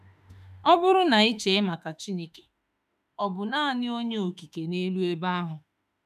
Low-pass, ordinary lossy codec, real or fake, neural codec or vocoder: 14.4 kHz; none; fake; autoencoder, 48 kHz, 32 numbers a frame, DAC-VAE, trained on Japanese speech